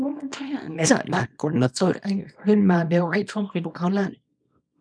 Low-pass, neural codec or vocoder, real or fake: 9.9 kHz; codec, 24 kHz, 0.9 kbps, WavTokenizer, small release; fake